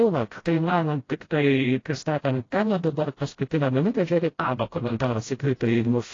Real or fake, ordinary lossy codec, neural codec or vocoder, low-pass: fake; AAC, 32 kbps; codec, 16 kHz, 0.5 kbps, FreqCodec, smaller model; 7.2 kHz